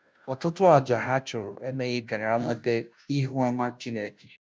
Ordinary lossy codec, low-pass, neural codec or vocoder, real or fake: none; none; codec, 16 kHz, 0.5 kbps, FunCodec, trained on Chinese and English, 25 frames a second; fake